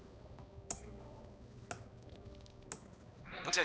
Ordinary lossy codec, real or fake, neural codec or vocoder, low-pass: none; fake; codec, 16 kHz, 2 kbps, X-Codec, HuBERT features, trained on balanced general audio; none